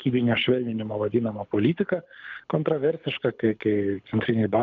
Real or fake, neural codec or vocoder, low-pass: fake; codec, 24 kHz, 6 kbps, HILCodec; 7.2 kHz